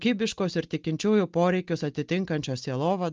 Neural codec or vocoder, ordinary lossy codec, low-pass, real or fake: none; Opus, 24 kbps; 7.2 kHz; real